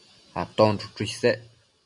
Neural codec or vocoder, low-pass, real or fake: none; 10.8 kHz; real